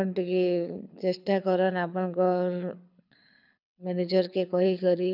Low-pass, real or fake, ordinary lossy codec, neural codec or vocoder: 5.4 kHz; fake; none; codec, 24 kHz, 6 kbps, HILCodec